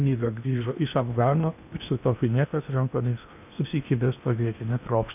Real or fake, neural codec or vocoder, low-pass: fake; codec, 16 kHz in and 24 kHz out, 0.8 kbps, FocalCodec, streaming, 65536 codes; 3.6 kHz